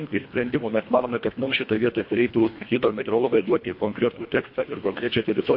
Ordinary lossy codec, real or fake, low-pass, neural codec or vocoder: MP3, 32 kbps; fake; 5.4 kHz; codec, 24 kHz, 1.5 kbps, HILCodec